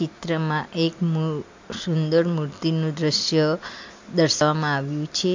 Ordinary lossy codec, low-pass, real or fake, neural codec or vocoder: MP3, 48 kbps; 7.2 kHz; real; none